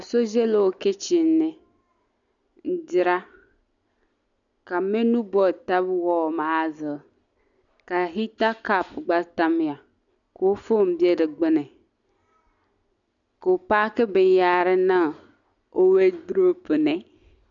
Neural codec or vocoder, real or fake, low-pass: none; real; 7.2 kHz